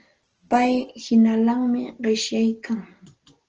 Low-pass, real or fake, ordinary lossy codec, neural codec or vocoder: 7.2 kHz; real; Opus, 16 kbps; none